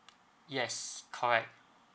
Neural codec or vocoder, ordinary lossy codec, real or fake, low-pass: none; none; real; none